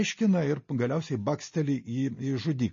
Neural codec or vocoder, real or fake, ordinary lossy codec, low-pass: none; real; MP3, 32 kbps; 7.2 kHz